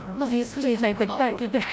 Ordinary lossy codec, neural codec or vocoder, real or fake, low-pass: none; codec, 16 kHz, 0.5 kbps, FreqCodec, larger model; fake; none